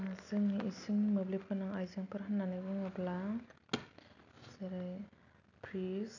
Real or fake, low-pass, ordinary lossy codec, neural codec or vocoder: real; 7.2 kHz; none; none